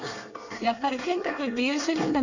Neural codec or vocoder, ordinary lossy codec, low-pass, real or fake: codec, 24 kHz, 1 kbps, SNAC; none; 7.2 kHz; fake